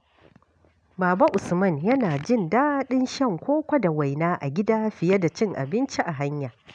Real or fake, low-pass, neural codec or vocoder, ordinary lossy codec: real; 9.9 kHz; none; none